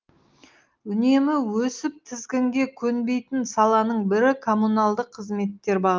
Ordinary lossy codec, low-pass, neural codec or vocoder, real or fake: Opus, 32 kbps; 7.2 kHz; none; real